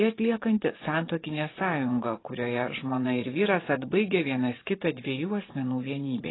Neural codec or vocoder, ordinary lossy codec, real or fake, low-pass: vocoder, 24 kHz, 100 mel bands, Vocos; AAC, 16 kbps; fake; 7.2 kHz